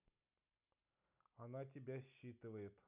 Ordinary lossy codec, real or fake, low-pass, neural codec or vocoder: none; real; 3.6 kHz; none